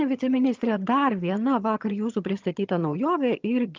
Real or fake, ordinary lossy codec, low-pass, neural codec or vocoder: fake; Opus, 24 kbps; 7.2 kHz; vocoder, 22.05 kHz, 80 mel bands, HiFi-GAN